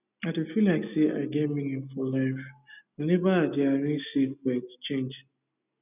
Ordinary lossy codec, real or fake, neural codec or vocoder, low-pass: none; real; none; 3.6 kHz